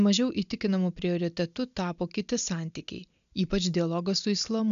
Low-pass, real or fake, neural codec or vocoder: 7.2 kHz; real; none